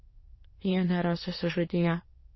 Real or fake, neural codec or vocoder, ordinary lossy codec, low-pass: fake; autoencoder, 22.05 kHz, a latent of 192 numbers a frame, VITS, trained on many speakers; MP3, 24 kbps; 7.2 kHz